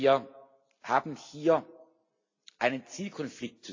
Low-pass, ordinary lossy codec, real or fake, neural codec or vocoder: 7.2 kHz; AAC, 48 kbps; real; none